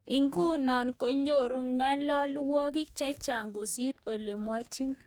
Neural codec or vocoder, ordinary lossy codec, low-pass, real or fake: codec, 44.1 kHz, 2.6 kbps, DAC; none; none; fake